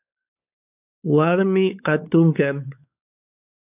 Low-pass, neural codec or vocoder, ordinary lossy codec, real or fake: 3.6 kHz; codec, 16 kHz, 4 kbps, X-Codec, WavLM features, trained on Multilingual LibriSpeech; AAC, 32 kbps; fake